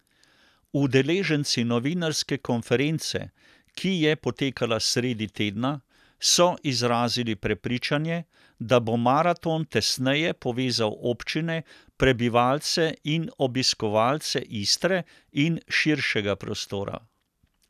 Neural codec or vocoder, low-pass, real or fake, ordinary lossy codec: none; 14.4 kHz; real; none